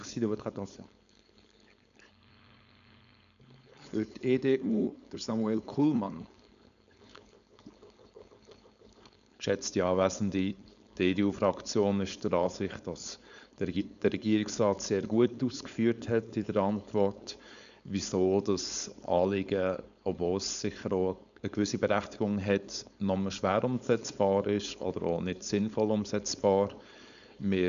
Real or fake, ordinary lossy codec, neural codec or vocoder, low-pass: fake; none; codec, 16 kHz, 4.8 kbps, FACodec; 7.2 kHz